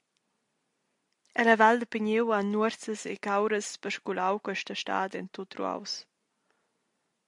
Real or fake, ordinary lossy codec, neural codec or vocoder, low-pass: real; MP3, 64 kbps; none; 10.8 kHz